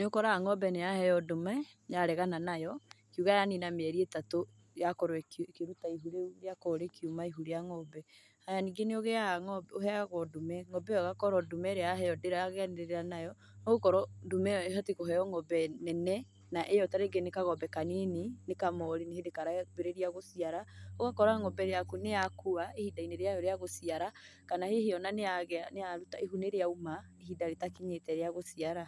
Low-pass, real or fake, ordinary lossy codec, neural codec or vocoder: none; real; none; none